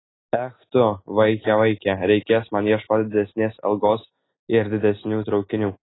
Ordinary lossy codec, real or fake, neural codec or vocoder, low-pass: AAC, 16 kbps; real; none; 7.2 kHz